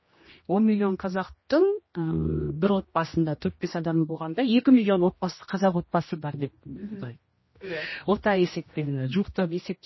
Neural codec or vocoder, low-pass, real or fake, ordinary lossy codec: codec, 16 kHz, 1 kbps, X-Codec, HuBERT features, trained on general audio; 7.2 kHz; fake; MP3, 24 kbps